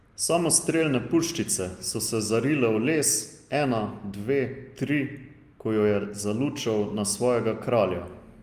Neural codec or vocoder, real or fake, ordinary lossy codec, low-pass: none; real; Opus, 32 kbps; 14.4 kHz